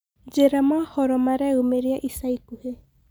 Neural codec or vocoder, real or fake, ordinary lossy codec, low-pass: none; real; none; none